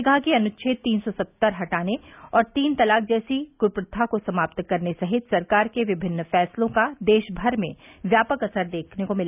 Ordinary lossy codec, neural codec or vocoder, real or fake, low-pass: none; none; real; 3.6 kHz